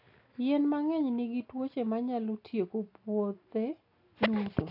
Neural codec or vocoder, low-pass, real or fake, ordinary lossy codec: none; 5.4 kHz; real; AAC, 48 kbps